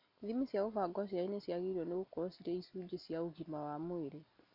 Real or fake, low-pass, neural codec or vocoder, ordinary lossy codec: real; 5.4 kHz; none; none